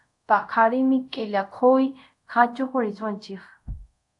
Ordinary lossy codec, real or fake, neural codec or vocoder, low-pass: AAC, 64 kbps; fake; codec, 24 kHz, 0.5 kbps, DualCodec; 10.8 kHz